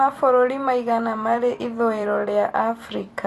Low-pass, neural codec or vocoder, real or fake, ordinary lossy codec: 14.4 kHz; vocoder, 44.1 kHz, 128 mel bands every 256 samples, BigVGAN v2; fake; AAC, 48 kbps